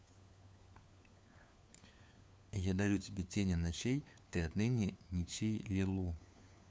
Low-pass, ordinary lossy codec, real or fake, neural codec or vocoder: none; none; fake; codec, 16 kHz, 4 kbps, FunCodec, trained on LibriTTS, 50 frames a second